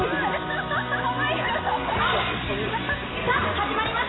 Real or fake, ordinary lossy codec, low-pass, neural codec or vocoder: real; AAC, 16 kbps; 7.2 kHz; none